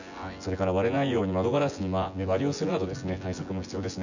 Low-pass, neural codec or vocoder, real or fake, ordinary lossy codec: 7.2 kHz; vocoder, 24 kHz, 100 mel bands, Vocos; fake; none